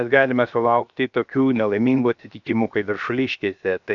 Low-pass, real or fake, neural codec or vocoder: 7.2 kHz; fake; codec, 16 kHz, 0.7 kbps, FocalCodec